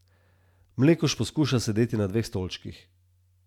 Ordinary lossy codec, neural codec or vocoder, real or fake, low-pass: none; none; real; 19.8 kHz